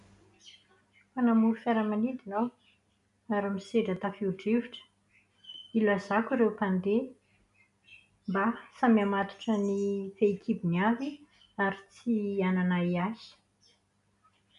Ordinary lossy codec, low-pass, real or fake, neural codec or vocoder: none; 10.8 kHz; real; none